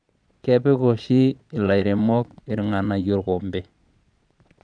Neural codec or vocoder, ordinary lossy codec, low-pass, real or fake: vocoder, 22.05 kHz, 80 mel bands, Vocos; none; 9.9 kHz; fake